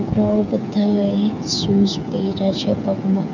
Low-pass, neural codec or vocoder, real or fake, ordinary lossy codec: 7.2 kHz; codec, 16 kHz, 8 kbps, FreqCodec, smaller model; fake; none